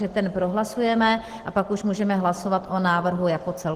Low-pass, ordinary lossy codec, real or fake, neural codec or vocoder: 14.4 kHz; Opus, 16 kbps; real; none